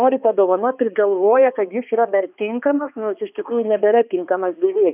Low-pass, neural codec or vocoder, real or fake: 3.6 kHz; codec, 16 kHz, 2 kbps, X-Codec, HuBERT features, trained on balanced general audio; fake